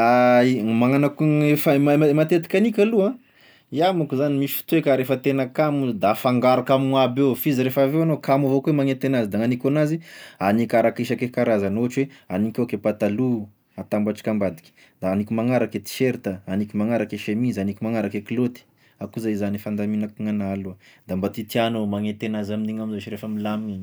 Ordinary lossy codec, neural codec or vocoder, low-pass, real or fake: none; none; none; real